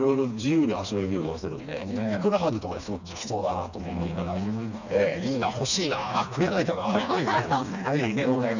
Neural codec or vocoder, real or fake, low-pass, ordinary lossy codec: codec, 16 kHz, 2 kbps, FreqCodec, smaller model; fake; 7.2 kHz; none